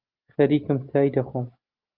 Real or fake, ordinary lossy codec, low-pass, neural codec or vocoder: real; Opus, 24 kbps; 5.4 kHz; none